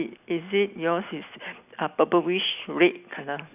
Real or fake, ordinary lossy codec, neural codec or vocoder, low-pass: real; none; none; 3.6 kHz